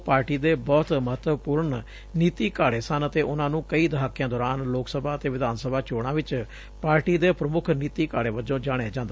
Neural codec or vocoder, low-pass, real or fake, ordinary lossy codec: none; none; real; none